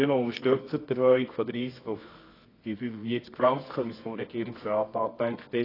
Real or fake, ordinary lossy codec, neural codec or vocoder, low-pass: fake; AAC, 24 kbps; codec, 24 kHz, 0.9 kbps, WavTokenizer, medium music audio release; 5.4 kHz